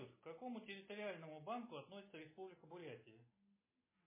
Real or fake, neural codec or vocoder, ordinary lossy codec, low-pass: real; none; MP3, 16 kbps; 3.6 kHz